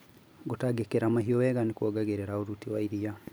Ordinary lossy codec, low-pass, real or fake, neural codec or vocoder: none; none; real; none